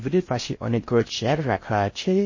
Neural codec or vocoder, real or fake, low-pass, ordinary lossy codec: codec, 16 kHz in and 24 kHz out, 0.6 kbps, FocalCodec, streaming, 4096 codes; fake; 7.2 kHz; MP3, 32 kbps